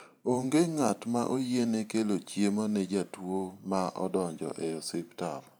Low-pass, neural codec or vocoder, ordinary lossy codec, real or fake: none; vocoder, 44.1 kHz, 128 mel bands every 512 samples, BigVGAN v2; none; fake